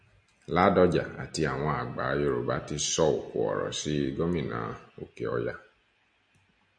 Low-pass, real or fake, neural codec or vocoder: 9.9 kHz; real; none